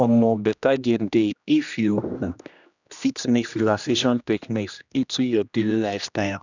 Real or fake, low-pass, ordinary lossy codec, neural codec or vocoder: fake; 7.2 kHz; none; codec, 16 kHz, 1 kbps, X-Codec, HuBERT features, trained on general audio